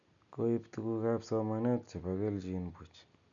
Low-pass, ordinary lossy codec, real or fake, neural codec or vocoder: 7.2 kHz; none; real; none